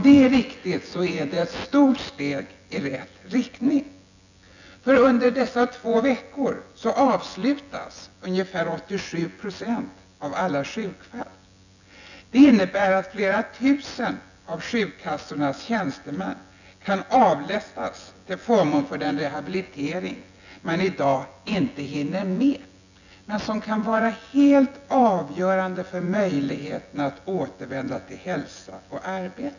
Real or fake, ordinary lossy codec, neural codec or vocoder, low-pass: fake; none; vocoder, 24 kHz, 100 mel bands, Vocos; 7.2 kHz